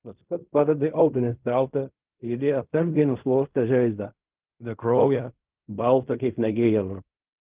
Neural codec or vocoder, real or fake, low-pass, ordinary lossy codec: codec, 16 kHz in and 24 kHz out, 0.4 kbps, LongCat-Audio-Codec, fine tuned four codebook decoder; fake; 3.6 kHz; Opus, 32 kbps